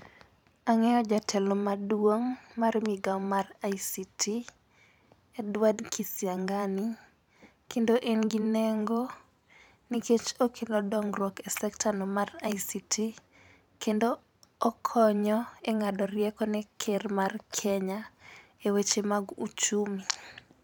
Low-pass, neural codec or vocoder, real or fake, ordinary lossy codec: 19.8 kHz; vocoder, 44.1 kHz, 128 mel bands every 512 samples, BigVGAN v2; fake; none